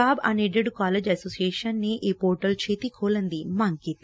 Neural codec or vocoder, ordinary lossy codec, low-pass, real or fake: none; none; none; real